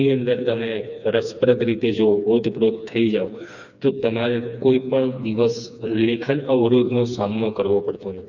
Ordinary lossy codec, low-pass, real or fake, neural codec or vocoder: none; 7.2 kHz; fake; codec, 16 kHz, 2 kbps, FreqCodec, smaller model